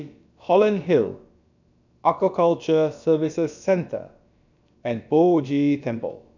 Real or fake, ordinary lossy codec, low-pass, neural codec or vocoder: fake; none; 7.2 kHz; codec, 16 kHz, about 1 kbps, DyCAST, with the encoder's durations